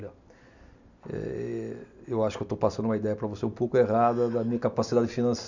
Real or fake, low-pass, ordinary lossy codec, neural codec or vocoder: real; 7.2 kHz; none; none